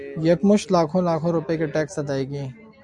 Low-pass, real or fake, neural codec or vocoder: 10.8 kHz; real; none